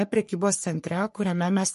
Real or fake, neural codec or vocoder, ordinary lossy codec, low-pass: fake; codec, 44.1 kHz, 3.4 kbps, Pupu-Codec; MP3, 48 kbps; 14.4 kHz